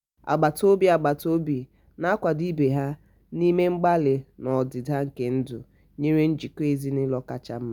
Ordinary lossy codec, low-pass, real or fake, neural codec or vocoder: none; 19.8 kHz; real; none